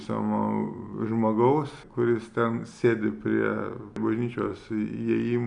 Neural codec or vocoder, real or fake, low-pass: none; real; 9.9 kHz